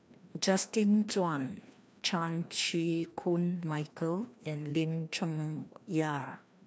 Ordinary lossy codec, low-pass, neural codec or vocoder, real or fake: none; none; codec, 16 kHz, 1 kbps, FreqCodec, larger model; fake